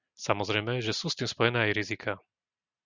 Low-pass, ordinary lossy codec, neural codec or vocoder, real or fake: 7.2 kHz; Opus, 64 kbps; none; real